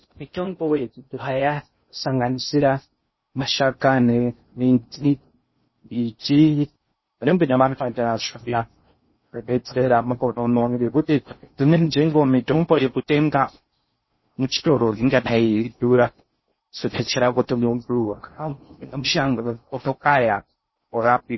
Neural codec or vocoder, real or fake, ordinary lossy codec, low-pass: codec, 16 kHz in and 24 kHz out, 0.6 kbps, FocalCodec, streaming, 2048 codes; fake; MP3, 24 kbps; 7.2 kHz